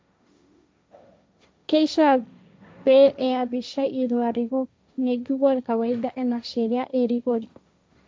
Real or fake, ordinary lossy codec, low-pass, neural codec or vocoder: fake; none; none; codec, 16 kHz, 1.1 kbps, Voila-Tokenizer